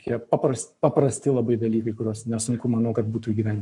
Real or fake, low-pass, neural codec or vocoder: fake; 10.8 kHz; codec, 44.1 kHz, 7.8 kbps, Pupu-Codec